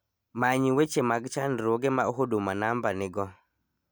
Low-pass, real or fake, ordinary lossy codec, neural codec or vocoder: none; real; none; none